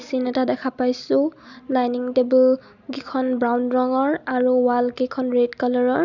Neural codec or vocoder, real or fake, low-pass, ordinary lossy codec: none; real; 7.2 kHz; none